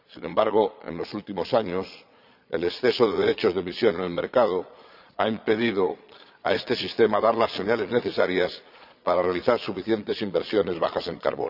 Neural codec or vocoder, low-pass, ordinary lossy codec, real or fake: vocoder, 22.05 kHz, 80 mel bands, Vocos; 5.4 kHz; none; fake